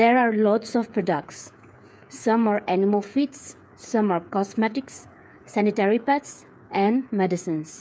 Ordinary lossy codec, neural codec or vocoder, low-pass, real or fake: none; codec, 16 kHz, 8 kbps, FreqCodec, smaller model; none; fake